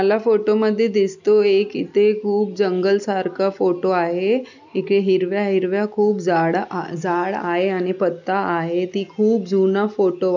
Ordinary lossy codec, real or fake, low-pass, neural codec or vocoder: none; real; 7.2 kHz; none